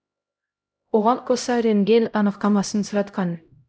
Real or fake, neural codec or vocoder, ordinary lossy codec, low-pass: fake; codec, 16 kHz, 0.5 kbps, X-Codec, HuBERT features, trained on LibriSpeech; none; none